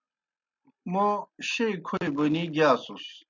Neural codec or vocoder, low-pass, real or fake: none; 7.2 kHz; real